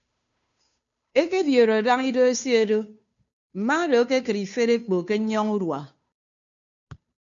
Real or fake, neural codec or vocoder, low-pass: fake; codec, 16 kHz, 2 kbps, FunCodec, trained on Chinese and English, 25 frames a second; 7.2 kHz